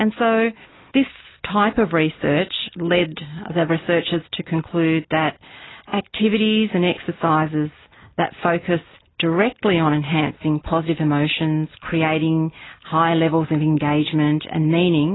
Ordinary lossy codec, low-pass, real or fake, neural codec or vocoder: AAC, 16 kbps; 7.2 kHz; real; none